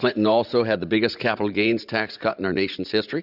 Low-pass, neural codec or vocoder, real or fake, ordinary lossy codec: 5.4 kHz; vocoder, 44.1 kHz, 80 mel bands, Vocos; fake; Opus, 64 kbps